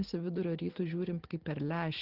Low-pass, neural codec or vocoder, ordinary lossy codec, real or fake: 5.4 kHz; none; Opus, 16 kbps; real